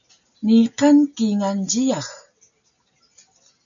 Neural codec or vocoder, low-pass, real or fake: none; 7.2 kHz; real